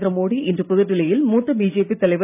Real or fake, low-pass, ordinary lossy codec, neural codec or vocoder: real; 3.6 kHz; none; none